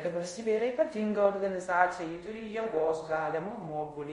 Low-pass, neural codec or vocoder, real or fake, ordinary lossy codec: 10.8 kHz; codec, 24 kHz, 0.5 kbps, DualCodec; fake; MP3, 48 kbps